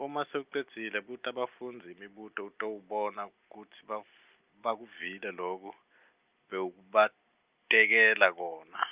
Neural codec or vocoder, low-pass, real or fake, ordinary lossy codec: none; 3.6 kHz; real; Opus, 24 kbps